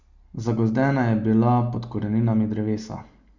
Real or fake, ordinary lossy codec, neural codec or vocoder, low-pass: real; Opus, 64 kbps; none; 7.2 kHz